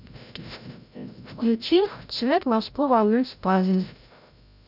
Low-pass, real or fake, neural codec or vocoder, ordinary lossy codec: 5.4 kHz; fake; codec, 16 kHz, 0.5 kbps, FreqCodec, larger model; none